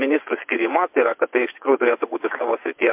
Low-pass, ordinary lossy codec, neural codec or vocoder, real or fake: 3.6 kHz; MP3, 32 kbps; vocoder, 22.05 kHz, 80 mel bands, WaveNeXt; fake